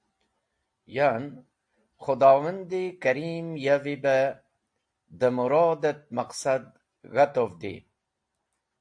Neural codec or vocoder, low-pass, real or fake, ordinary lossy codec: none; 9.9 kHz; real; AAC, 64 kbps